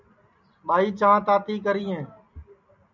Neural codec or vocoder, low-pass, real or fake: none; 7.2 kHz; real